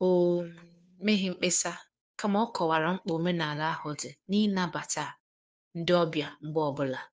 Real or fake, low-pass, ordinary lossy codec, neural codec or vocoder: fake; none; none; codec, 16 kHz, 2 kbps, FunCodec, trained on Chinese and English, 25 frames a second